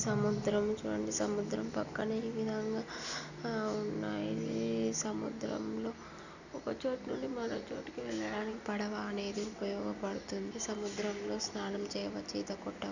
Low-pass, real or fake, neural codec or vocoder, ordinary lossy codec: 7.2 kHz; real; none; none